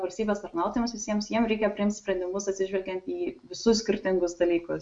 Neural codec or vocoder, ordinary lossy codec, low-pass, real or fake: none; MP3, 64 kbps; 9.9 kHz; real